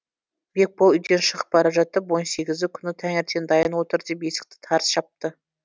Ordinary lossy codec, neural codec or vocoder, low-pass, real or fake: none; none; none; real